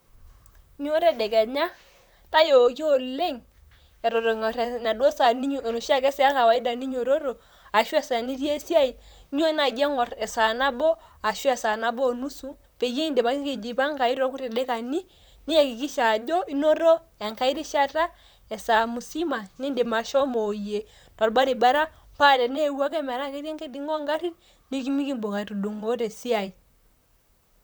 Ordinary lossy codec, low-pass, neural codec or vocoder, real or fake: none; none; vocoder, 44.1 kHz, 128 mel bands, Pupu-Vocoder; fake